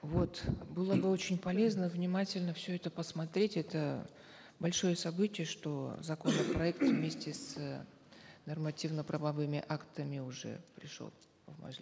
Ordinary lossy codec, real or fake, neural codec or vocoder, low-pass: none; real; none; none